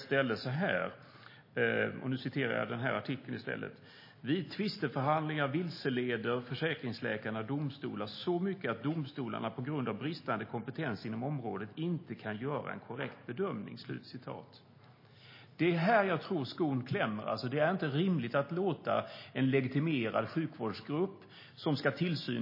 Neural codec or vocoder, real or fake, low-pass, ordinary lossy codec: none; real; 5.4 kHz; MP3, 24 kbps